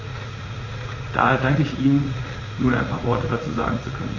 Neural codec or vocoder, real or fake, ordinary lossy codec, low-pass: vocoder, 44.1 kHz, 80 mel bands, Vocos; fake; AAC, 32 kbps; 7.2 kHz